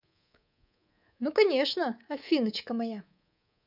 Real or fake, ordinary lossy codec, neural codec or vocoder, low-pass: fake; none; codec, 24 kHz, 3.1 kbps, DualCodec; 5.4 kHz